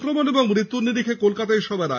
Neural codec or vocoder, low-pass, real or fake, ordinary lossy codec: none; 7.2 kHz; real; none